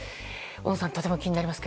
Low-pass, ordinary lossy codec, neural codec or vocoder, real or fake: none; none; none; real